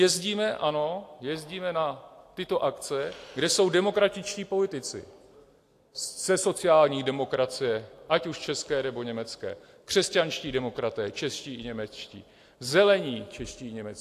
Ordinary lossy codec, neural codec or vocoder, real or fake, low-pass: AAC, 64 kbps; none; real; 14.4 kHz